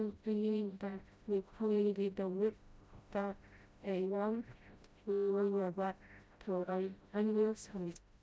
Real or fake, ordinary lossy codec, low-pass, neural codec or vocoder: fake; none; none; codec, 16 kHz, 0.5 kbps, FreqCodec, smaller model